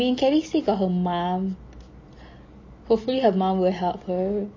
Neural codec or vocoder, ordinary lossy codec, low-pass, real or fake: none; MP3, 32 kbps; 7.2 kHz; real